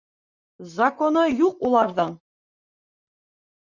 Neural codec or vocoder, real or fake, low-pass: vocoder, 44.1 kHz, 128 mel bands, Pupu-Vocoder; fake; 7.2 kHz